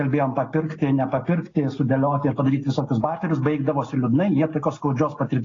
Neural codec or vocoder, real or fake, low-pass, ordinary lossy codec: none; real; 7.2 kHz; AAC, 32 kbps